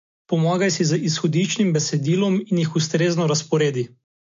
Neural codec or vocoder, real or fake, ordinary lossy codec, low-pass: none; real; MP3, 48 kbps; 7.2 kHz